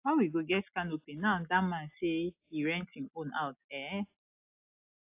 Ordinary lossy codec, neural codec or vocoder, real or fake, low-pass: AAC, 32 kbps; none; real; 3.6 kHz